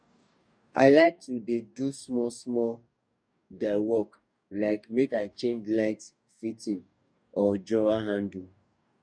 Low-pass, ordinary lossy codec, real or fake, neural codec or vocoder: 9.9 kHz; none; fake; codec, 44.1 kHz, 2.6 kbps, DAC